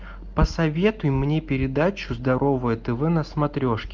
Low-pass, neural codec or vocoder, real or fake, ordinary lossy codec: 7.2 kHz; none; real; Opus, 32 kbps